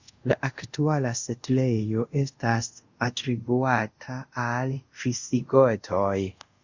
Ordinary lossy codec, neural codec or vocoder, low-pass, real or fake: Opus, 64 kbps; codec, 24 kHz, 0.5 kbps, DualCodec; 7.2 kHz; fake